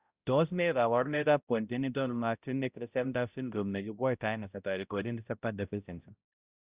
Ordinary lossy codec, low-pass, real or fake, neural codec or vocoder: Opus, 64 kbps; 3.6 kHz; fake; codec, 16 kHz, 0.5 kbps, X-Codec, HuBERT features, trained on balanced general audio